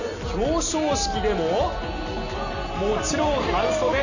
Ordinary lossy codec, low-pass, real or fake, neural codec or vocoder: none; 7.2 kHz; real; none